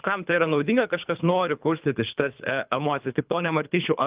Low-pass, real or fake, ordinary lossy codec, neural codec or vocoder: 3.6 kHz; fake; Opus, 32 kbps; codec, 24 kHz, 3 kbps, HILCodec